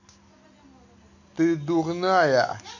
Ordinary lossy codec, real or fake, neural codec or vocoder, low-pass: none; real; none; 7.2 kHz